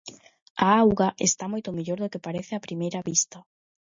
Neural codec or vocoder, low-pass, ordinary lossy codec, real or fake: none; 7.2 kHz; MP3, 48 kbps; real